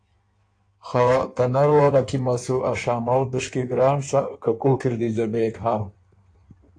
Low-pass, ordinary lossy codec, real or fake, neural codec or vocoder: 9.9 kHz; AAC, 48 kbps; fake; codec, 16 kHz in and 24 kHz out, 1.1 kbps, FireRedTTS-2 codec